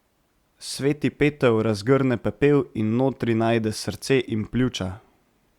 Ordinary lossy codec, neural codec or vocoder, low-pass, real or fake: Opus, 64 kbps; none; 19.8 kHz; real